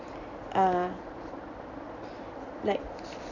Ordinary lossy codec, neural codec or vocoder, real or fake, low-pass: none; none; real; 7.2 kHz